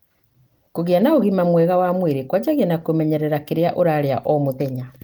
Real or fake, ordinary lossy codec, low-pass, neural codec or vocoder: real; Opus, 24 kbps; 19.8 kHz; none